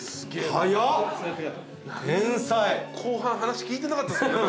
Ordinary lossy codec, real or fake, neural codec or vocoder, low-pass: none; real; none; none